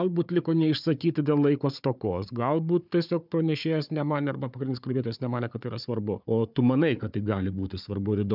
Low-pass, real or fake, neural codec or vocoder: 5.4 kHz; fake; codec, 16 kHz, 4 kbps, FunCodec, trained on Chinese and English, 50 frames a second